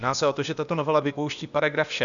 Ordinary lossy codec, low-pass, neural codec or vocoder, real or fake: MP3, 96 kbps; 7.2 kHz; codec, 16 kHz, 0.8 kbps, ZipCodec; fake